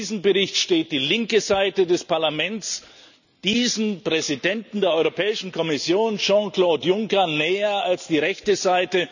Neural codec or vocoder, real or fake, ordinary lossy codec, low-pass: none; real; none; 7.2 kHz